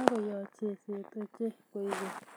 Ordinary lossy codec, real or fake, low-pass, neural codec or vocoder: none; real; none; none